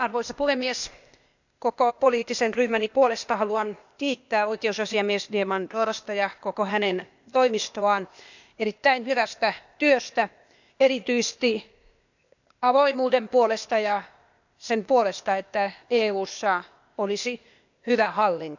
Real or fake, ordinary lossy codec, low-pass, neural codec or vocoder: fake; none; 7.2 kHz; codec, 16 kHz, 0.8 kbps, ZipCodec